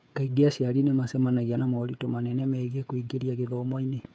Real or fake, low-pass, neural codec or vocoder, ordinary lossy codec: fake; none; codec, 16 kHz, 8 kbps, FreqCodec, smaller model; none